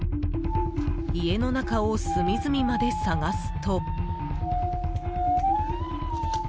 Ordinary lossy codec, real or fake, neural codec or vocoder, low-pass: none; real; none; none